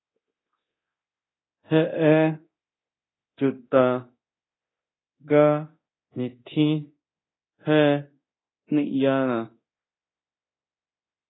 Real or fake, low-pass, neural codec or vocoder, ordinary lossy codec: fake; 7.2 kHz; codec, 24 kHz, 0.9 kbps, DualCodec; AAC, 16 kbps